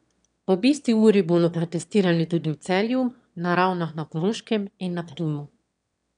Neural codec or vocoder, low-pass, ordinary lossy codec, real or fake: autoencoder, 22.05 kHz, a latent of 192 numbers a frame, VITS, trained on one speaker; 9.9 kHz; none; fake